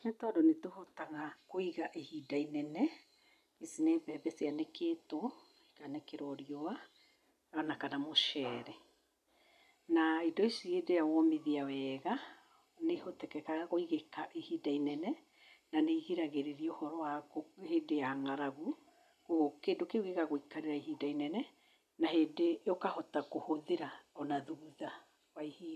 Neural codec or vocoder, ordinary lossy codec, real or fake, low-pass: none; none; real; 14.4 kHz